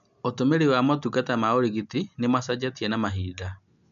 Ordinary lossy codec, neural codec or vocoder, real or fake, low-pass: none; none; real; 7.2 kHz